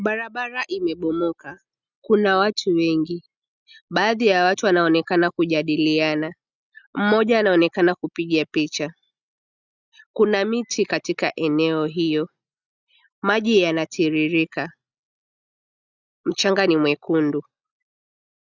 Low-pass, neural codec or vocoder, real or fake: 7.2 kHz; none; real